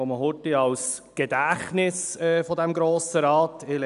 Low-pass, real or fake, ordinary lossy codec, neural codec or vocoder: 10.8 kHz; real; none; none